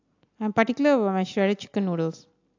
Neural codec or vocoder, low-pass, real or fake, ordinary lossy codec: none; 7.2 kHz; real; AAC, 48 kbps